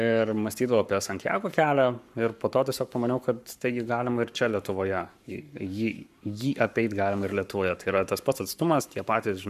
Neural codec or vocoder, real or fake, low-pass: codec, 44.1 kHz, 7.8 kbps, Pupu-Codec; fake; 14.4 kHz